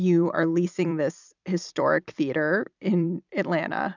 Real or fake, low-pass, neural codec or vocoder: fake; 7.2 kHz; vocoder, 44.1 kHz, 128 mel bands every 256 samples, BigVGAN v2